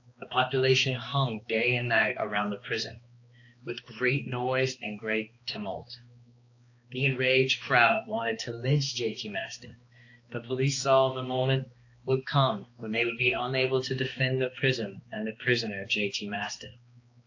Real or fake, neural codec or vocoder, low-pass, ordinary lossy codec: fake; codec, 16 kHz, 2 kbps, X-Codec, HuBERT features, trained on balanced general audio; 7.2 kHz; AAC, 48 kbps